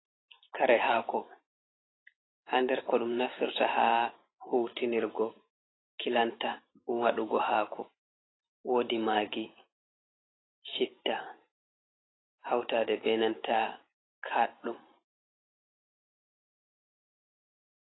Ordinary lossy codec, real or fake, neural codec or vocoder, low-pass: AAC, 16 kbps; fake; vocoder, 44.1 kHz, 128 mel bands every 512 samples, BigVGAN v2; 7.2 kHz